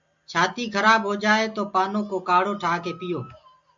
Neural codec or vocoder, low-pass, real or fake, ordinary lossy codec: none; 7.2 kHz; real; AAC, 64 kbps